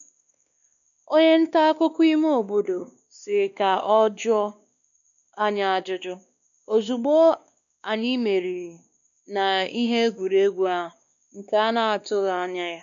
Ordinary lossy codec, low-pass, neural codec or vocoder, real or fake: none; 7.2 kHz; codec, 16 kHz, 2 kbps, X-Codec, WavLM features, trained on Multilingual LibriSpeech; fake